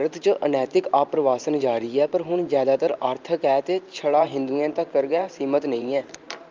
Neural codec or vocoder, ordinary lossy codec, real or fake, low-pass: vocoder, 44.1 kHz, 128 mel bands every 512 samples, BigVGAN v2; Opus, 24 kbps; fake; 7.2 kHz